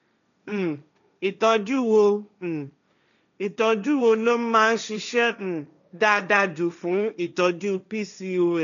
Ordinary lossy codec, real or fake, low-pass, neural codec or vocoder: none; fake; 7.2 kHz; codec, 16 kHz, 1.1 kbps, Voila-Tokenizer